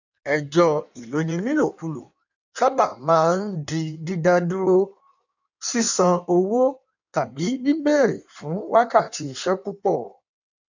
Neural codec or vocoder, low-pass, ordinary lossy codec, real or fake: codec, 16 kHz in and 24 kHz out, 1.1 kbps, FireRedTTS-2 codec; 7.2 kHz; none; fake